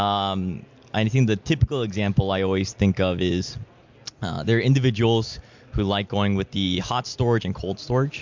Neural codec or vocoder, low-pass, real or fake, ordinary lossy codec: none; 7.2 kHz; real; MP3, 64 kbps